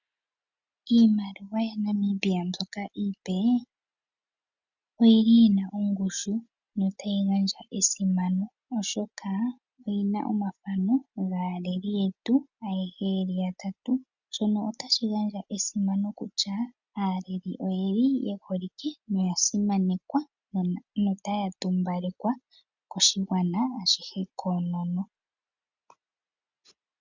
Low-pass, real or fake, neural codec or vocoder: 7.2 kHz; real; none